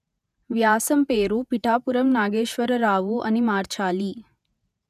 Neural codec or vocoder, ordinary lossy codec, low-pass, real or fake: vocoder, 48 kHz, 128 mel bands, Vocos; none; 14.4 kHz; fake